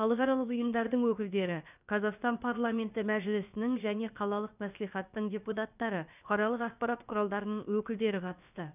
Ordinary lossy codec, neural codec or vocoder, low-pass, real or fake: none; codec, 16 kHz, about 1 kbps, DyCAST, with the encoder's durations; 3.6 kHz; fake